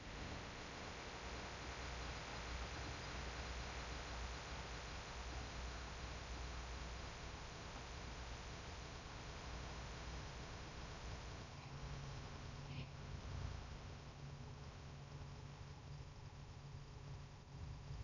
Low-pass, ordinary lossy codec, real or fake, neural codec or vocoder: 7.2 kHz; none; fake; codec, 16 kHz in and 24 kHz out, 0.6 kbps, FocalCodec, streaming, 2048 codes